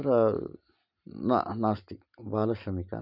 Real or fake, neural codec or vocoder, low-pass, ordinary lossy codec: real; none; 5.4 kHz; none